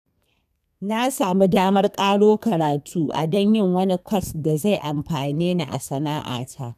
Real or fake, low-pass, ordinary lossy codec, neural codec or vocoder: fake; 14.4 kHz; none; codec, 32 kHz, 1.9 kbps, SNAC